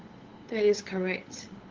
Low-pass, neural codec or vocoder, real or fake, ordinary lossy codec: 7.2 kHz; codec, 16 kHz, 8 kbps, FunCodec, trained on LibriTTS, 25 frames a second; fake; Opus, 16 kbps